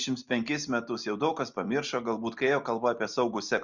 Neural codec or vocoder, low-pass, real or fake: none; 7.2 kHz; real